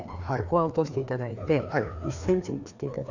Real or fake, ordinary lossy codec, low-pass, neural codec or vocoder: fake; none; 7.2 kHz; codec, 16 kHz, 2 kbps, FreqCodec, larger model